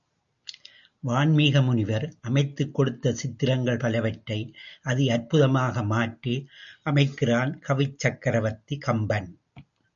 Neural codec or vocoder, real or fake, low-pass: none; real; 7.2 kHz